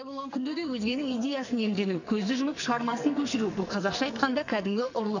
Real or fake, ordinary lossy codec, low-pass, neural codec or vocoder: fake; none; 7.2 kHz; codec, 44.1 kHz, 2.6 kbps, SNAC